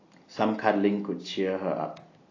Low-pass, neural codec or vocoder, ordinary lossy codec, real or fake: 7.2 kHz; none; AAC, 32 kbps; real